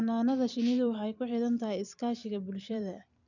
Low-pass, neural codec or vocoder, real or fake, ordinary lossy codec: 7.2 kHz; vocoder, 44.1 kHz, 128 mel bands every 256 samples, BigVGAN v2; fake; none